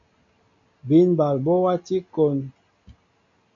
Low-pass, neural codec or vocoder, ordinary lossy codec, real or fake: 7.2 kHz; none; AAC, 64 kbps; real